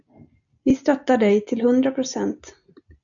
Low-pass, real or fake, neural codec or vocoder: 7.2 kHz; real; none